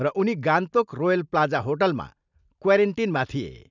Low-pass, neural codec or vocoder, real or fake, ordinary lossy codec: 7.2 kHz; none; real; none